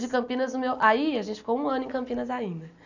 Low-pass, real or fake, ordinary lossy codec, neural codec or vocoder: 7.2 kHz; real; none; none